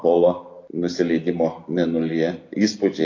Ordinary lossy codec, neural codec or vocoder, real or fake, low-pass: AAC, 32 kbps; none; real; 7.2 kHz